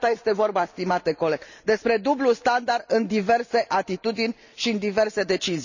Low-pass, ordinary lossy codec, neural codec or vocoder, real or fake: 7.2 kHz; none; none; real